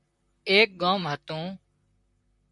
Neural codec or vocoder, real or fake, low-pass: vocoder, 44.1 kHz, 128 mel bands, Pupu-Vocoder; fake; 10.8 kHz